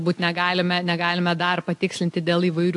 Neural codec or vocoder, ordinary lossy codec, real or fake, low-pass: none; AAC, 64 kbps; real; 10.8 kHz